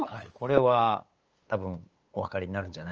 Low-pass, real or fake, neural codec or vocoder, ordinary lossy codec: 7.2 kHz; fake; codec, 16 kHz, 8 kbps, FunCodec, trained on LibriTTS, 25 frames a second; Opus, 24 kbps